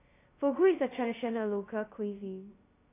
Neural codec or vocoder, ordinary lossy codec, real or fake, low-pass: codec, 16 kHz, 0.2 kbps, FocalCodec; AAC, 24 kbps; fake; 3.6 kHz